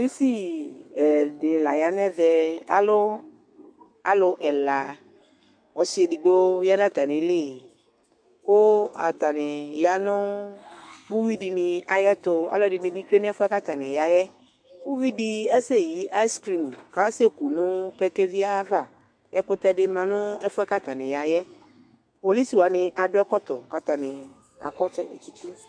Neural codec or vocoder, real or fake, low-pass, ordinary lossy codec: codec, 32 kHz, 1.9 kbps, SNAC; fake; 9.9 kHz; MP3, 64 kbps